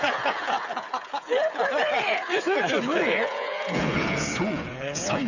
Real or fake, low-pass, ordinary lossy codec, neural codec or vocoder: fake; 7.2 kHz; none; codec, 16 kHz, 8 kbps, FreqCodec, smaller model